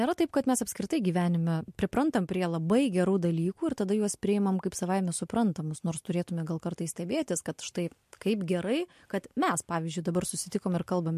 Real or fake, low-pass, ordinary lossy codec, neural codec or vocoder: real; 14.4 kHz; MP3, 64 kbps; none